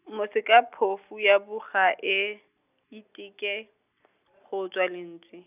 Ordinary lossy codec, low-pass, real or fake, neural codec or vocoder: none; 3.6 kHz; real; none